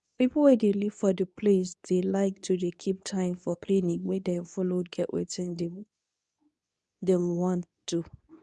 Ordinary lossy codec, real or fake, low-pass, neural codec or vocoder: none; fake; 10.8 kHz; codec, 24 kHz, 0.9 kbps, WavTokenizer, medium speech release version 2